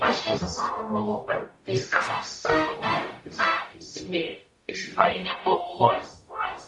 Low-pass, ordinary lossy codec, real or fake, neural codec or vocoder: 10.8 kHz; MP3, 48 kbps; fake; codec, 44.1 kHz, 0.9 kbps, DAC